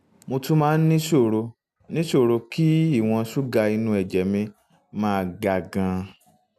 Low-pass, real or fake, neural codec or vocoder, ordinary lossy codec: 14.4 kHz; real; none; none